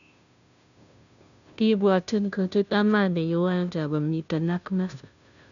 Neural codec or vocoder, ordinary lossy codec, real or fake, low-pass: codec, 16 kHz, 0.5 kbps, FunCodec, trained on Chinese and English, 25 frames a second; none; fake; 7.2 kHz